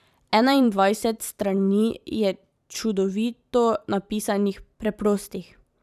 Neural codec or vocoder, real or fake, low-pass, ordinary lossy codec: vocoder, 44.1 kHz, 128 mel bands every 512 samples, BigVGAN v2; fake; 14.4 kHz; none